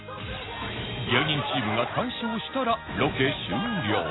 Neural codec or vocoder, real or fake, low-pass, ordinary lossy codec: none; real; 7.2 kHz; AAC, 16 kbps